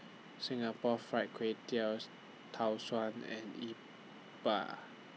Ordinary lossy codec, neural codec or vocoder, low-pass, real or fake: none; none; none; real